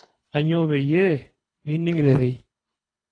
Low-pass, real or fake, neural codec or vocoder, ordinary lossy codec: 9.9 kHz; fake; codec, 24 kHz, 3 kbps, HILCodec; AAC, 32 kbps